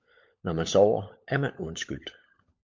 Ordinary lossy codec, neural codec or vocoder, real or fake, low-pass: MP3, 48 kbps; codec, 16 kHz, 16 kbps, FunCodec, trained on LibriTTS, 50 frames a second; fake; 7.2 kHz